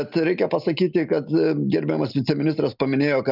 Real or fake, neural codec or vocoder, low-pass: real; none; 5.4 kHz